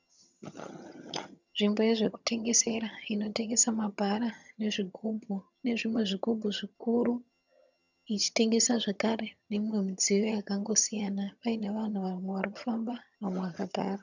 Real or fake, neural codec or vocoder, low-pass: fake; vocoder, 22.05 kHz, 80 mel bands, HiFi-GAN; 7.2 kHz